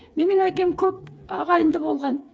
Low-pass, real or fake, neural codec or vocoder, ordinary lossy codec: none; fake; codec, 16 kHz, 4 kbps, FreqCodec, smaller model; none